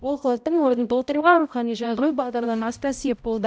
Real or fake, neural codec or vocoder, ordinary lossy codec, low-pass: fake; codec, 16 kHz, 0.5 kbps, X-Codec, HuBERT features, trained on balanced general audio; none; none